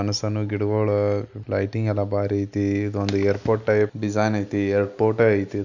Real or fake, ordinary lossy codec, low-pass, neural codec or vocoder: real; none; 7.2 kHz; none